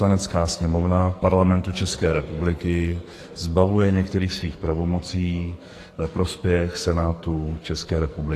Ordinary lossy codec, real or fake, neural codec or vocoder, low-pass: AAC, 48 kbps; fake; codec, 44.1 kHz, 2.6 kbps, SNAC; 14.4 kHz